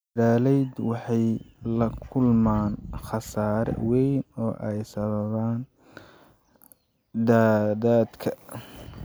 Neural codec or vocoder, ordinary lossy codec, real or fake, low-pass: none; none; real; none